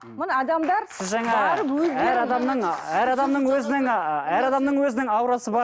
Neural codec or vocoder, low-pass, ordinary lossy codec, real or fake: none; none; none; real